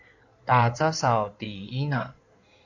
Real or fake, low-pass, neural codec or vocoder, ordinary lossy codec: fake; 7.2 kHz; codec, 16 kHz in and 24 kHz out, 2.2 kbps, FireRedTTS-2 codec; AAC, 48 kbps